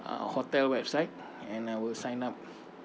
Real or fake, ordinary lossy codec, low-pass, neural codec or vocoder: real; none; none; none